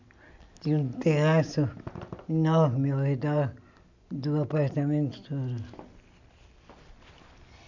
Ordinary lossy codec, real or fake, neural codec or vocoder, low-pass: none; real; none; 7.2 kHz